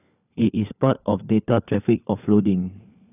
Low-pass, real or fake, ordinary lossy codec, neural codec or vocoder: 3.6 kHz; fake; none; codec, 16 kHz, 4 kbps, FunCodec, trained on LibriTTS, 50 frames a second